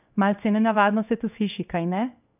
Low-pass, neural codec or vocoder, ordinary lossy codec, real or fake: 3.6 kHz; codec, 16 kHz in and 24 kHz out, 1 kbps, XY-Tokenizer; none; fake